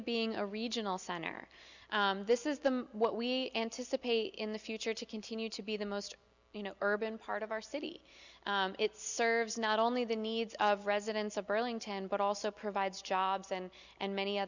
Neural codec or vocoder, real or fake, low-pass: none; real; 7.2 kHz